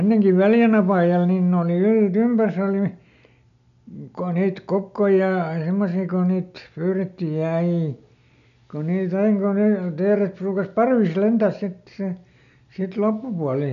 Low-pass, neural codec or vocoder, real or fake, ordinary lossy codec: 7.2 kHz; none; real; none